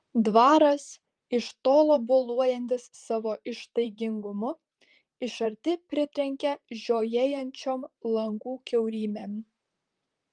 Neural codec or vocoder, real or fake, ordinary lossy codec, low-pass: vocoder, 44.1 kHz, 128 mel bands, Pupu-Vocoder; fake; Opus, 32 kbps; 9.9 kHz